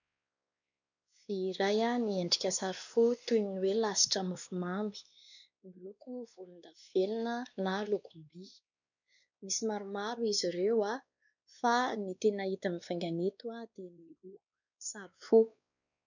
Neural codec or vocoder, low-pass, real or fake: codec, 16 kHz, 2 kbps, X-Codec, WavLM features, trained on Multilingual LibriSpeech; 7.2 kHz; fake